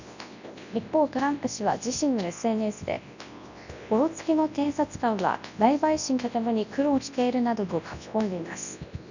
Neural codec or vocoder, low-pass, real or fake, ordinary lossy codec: codec, 24 kHz, 0.9 kbps, WavTokenizer, large speech release; 7.2 kHz; fake; none